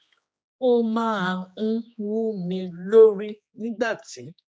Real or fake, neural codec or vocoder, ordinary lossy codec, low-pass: fake; codec, 16 kHz, 2 kbps, X-Codec, HuBERT features, trained on general audio; none; none